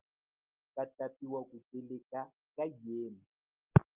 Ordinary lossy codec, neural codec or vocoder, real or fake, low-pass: Opus, 24 kbps; none; real; 3.6 kHz